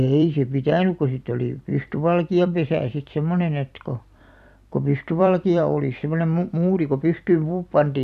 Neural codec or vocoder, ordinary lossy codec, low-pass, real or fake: vocoder, 44.1 kHz, 128 mel bands every 256 samples, BigVGAN v2; none; 14.4 kHz; fake